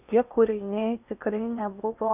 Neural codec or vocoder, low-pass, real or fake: codec, 16 kHz in and 24 kHz out, 0.8 kbps, FocalCodec, streaming, 65536 codes; 3.6 kHz; fake